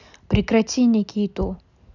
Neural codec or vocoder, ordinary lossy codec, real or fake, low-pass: none; none; real; 7.2 kHz